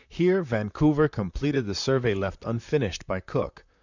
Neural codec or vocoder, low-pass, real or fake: vocoder, 44.1 kHz, 128 mel bands, Pupu-Vocoder; 7.2 kHz; fake